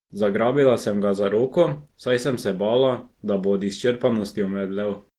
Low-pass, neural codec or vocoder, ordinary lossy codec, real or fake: 19.8 kHz; none; Opus, 16 kbps; real